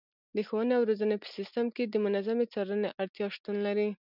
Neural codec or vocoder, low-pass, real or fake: none; 5.4 kHz; real